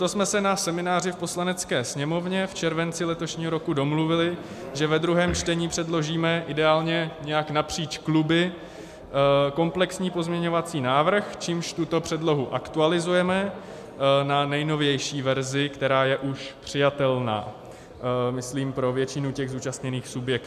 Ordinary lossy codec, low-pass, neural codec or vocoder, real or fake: MP3, 96 kbps; 14.4 kHz; none; real